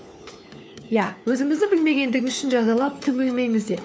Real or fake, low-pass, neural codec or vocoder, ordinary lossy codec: fake; none; codec, 16 kHz, 4 kbps, FunCodec, trained on LibriTTS, 50 frames a second; none